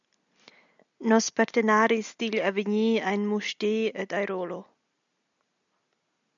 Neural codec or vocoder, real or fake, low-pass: none; real; 7.2 kHz